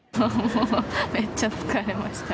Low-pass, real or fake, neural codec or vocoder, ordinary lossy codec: none; real; none; none